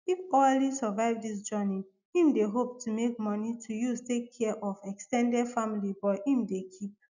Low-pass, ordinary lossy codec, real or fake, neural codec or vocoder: 7.2 kHz; none; real; none